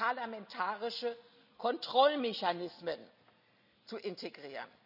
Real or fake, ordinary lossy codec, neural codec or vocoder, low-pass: real; none; none; 5.4 kHz